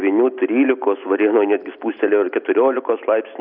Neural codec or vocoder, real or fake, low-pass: none; real; 5.4 kHz